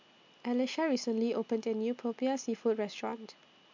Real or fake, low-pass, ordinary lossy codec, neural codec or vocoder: real; 7.2 kHz; MP3, 64 kbps; none